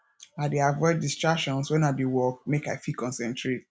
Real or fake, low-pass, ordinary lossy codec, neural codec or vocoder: real; none; none; none